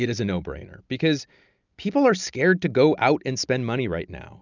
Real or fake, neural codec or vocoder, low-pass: real; none; 7.2 kHz